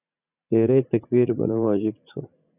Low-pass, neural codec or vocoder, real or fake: 3.6 kHz; vocoder, 44.1 kHz, 80 mel bands, Vocos; fake